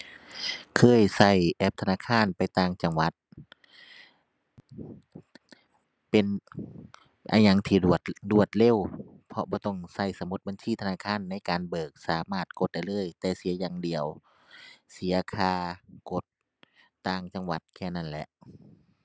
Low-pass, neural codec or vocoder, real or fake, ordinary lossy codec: none; none; real; none